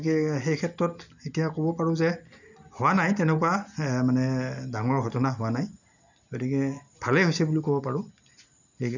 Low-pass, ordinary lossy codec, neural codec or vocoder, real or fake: 7.2 kHz; none; none; real